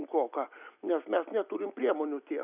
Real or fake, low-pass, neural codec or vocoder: real; 3.6 kHz; none